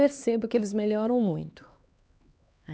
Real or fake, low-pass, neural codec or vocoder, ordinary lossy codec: fake; none; codec, 16 kHz, 2 kbps, X-Codec, HuBERT features, trained on LibriSpeech; none